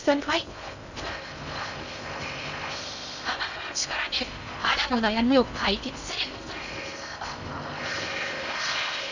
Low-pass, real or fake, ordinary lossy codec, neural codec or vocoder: 7.2 kHz; fake; none; codec, 16 kHz in and 24 kHz out, 0.6 kbps, FocalCodec, streaming, 2048 codes